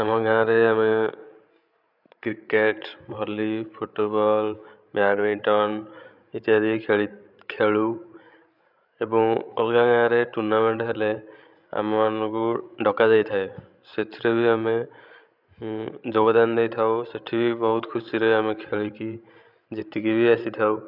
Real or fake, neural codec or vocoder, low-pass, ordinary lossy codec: fake; codec, 16 kHz, 16 kbps, FreqCodec, larger model; 5.4 kHz; none